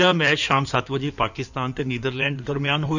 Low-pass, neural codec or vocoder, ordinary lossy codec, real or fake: 7.2 kHz; codec, 16 kHz in and 24 kHz out, 2.2 kbps, FireRedTTS-2 codec; none; fake